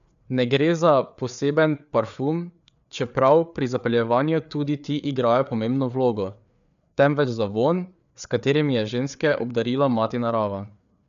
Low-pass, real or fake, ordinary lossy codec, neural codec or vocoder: 7.2 kHz; fake; none; codec, 16 kHz, 4 kbps, FreqCodec, larger model